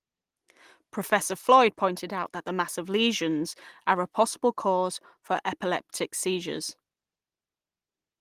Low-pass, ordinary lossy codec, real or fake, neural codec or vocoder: 14.4 kHz; Opus, 24 kbps; real; none